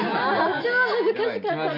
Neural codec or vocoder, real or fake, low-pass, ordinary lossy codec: none; real; 5.4 kHz; none